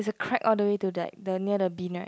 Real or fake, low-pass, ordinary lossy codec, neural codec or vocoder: real; none; none; none